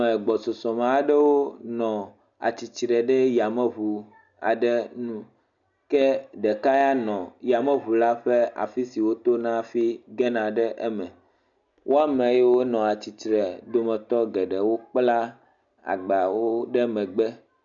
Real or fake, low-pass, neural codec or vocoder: real; 7.2 kHz; none